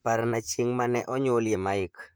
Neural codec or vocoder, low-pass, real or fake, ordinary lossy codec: vocoder, 44.1 kHz, 128 mel bands, Pupu-Vocoder; none; fake; none